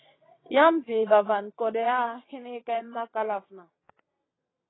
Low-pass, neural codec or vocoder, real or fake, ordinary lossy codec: 7.2 kHz; vocoder, 22.05 kHz, 80 mel bands, WaveNeXt; fake; AAC, 16 kbps